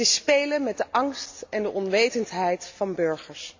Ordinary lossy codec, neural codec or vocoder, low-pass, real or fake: AAC, 48 kbps; none; 7.2 kHz; real